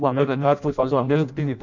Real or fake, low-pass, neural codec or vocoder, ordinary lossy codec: fake; 7.2 kHz; codec, 16 kHz in and 24 kHz out, 0.6 kbps, FireRedTTS-2 codec; none